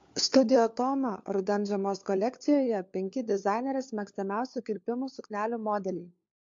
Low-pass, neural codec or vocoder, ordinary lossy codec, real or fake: 7.2 kHz; codec, 16 kHz, 4 kbps, FunCodec, trained on LibriTTS, 50 frames a second; MP3, 48 kbps; fake